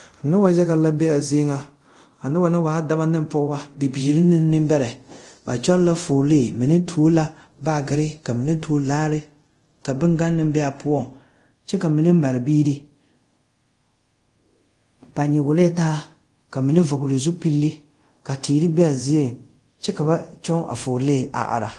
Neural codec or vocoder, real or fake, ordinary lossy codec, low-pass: codec, 24 kHz, 0.5 kbps, DualCodec; fake; Opus, 24 kbps; 10.8 kHz